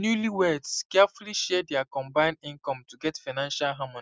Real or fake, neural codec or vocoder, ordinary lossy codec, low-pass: real; none; none; none